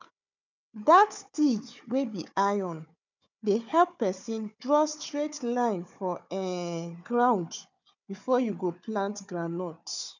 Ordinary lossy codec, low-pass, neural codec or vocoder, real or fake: none; 7.2 kHz; codec, 16 kHz, 4 kbps, FunCodec, trained on Chinese and English, 50 frames a second; fake